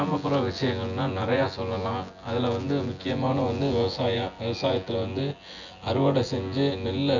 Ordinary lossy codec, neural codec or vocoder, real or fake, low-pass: none; vocoder, 24 kHz, 100 mel bands, Vocos; fake; 7.2 kHz